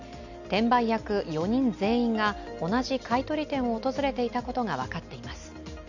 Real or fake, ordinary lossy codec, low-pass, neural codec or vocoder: real; none; 7.2 kHz; none